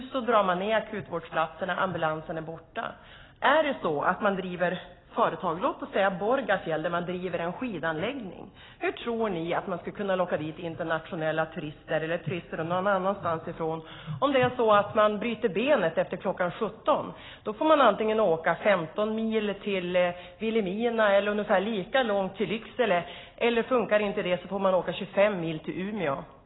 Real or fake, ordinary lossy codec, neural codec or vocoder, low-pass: real; AAC, 16 kbps; none; 7.2 kHz